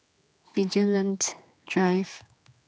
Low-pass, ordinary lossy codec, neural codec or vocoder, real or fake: none; none; codec, 16 kHz, 2 kbps, X-Codec, HuBERT features, trained on general audio; fake